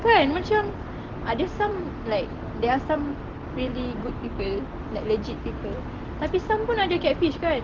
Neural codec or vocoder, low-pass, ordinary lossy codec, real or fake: none; 7.2 kHz; Opus, 16 kbps; real